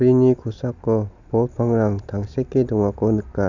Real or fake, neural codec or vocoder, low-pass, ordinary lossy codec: real; none; 7.2 kHz; none